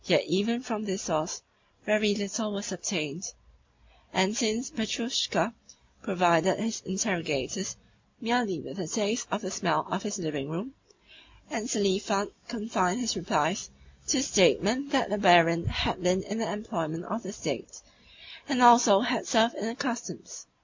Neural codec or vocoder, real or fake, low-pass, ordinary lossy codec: none; real; 7.2 kHz; MP3, 48 kbps